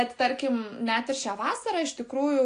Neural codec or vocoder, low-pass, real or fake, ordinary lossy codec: none; 9.9 kHz; real; AAC, 48 kbps